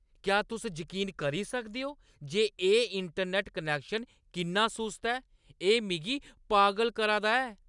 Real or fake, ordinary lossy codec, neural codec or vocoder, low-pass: real; none; none; 10.8 kHz